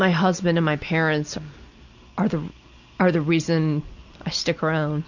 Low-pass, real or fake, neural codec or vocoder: 7.2 kHz; real; none